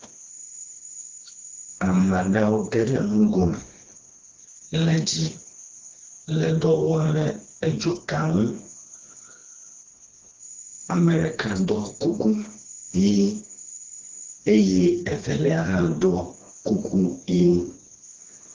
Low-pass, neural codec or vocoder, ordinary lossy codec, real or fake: 7.2 kHz; codec, 16 kHz, 2 kbps, FreqCodec, smaller model; Opus, 16 kbps; fake